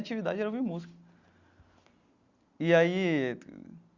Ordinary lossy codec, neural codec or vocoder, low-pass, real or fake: Opus, 64 kbps; none; 7.2 kHz; real